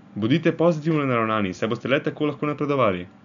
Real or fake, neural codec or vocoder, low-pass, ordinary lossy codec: real; none; 7.2 kHz; none